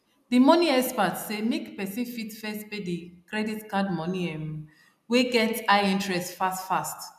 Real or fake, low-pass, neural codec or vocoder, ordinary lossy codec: real; 14.4 kHz; none; none